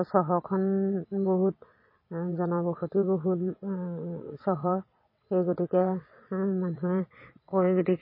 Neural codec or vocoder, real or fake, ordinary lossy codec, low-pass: vocoder, 22.05 kHz, 80 mel bands, WaveNeXt; fake; MP3, 24 kbps; 5.4 kHz